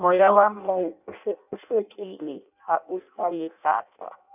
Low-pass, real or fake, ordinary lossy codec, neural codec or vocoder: 3.6 kHz; fake; none; codec, 16 kHz in and 24 kHz out, 0.6 kbps, FireRedTTS-2 codec